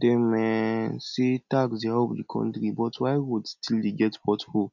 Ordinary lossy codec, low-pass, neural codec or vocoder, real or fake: none; 7.2 kHz; none; real